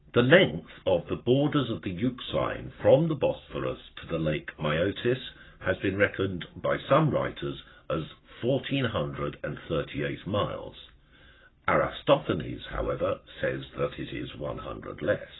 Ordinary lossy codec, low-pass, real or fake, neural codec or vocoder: AAC, 16 kbps; 7.2 kHz; fake; codec, 44.1 kHz, 7.8 kbps, Pupu-Codec